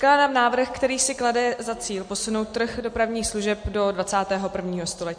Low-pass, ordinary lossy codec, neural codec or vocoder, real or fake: 9.9 kHz; MP3, 48 kbps; none; real